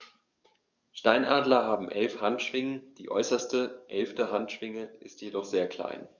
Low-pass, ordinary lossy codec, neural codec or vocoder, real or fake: 7.2 kHz; none; codec, 44.1 kHz, 7.8 kbps, DAC; fake